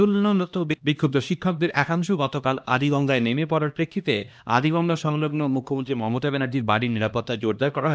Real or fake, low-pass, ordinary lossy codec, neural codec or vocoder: fake; none; none; codec, 16 kHz, 1 kbps, X-Codec, HuBERT features, trained on LibriSpeech